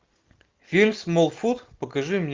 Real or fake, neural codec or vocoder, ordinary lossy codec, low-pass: real; none; Opus, 32 kbps; 7.2 kHz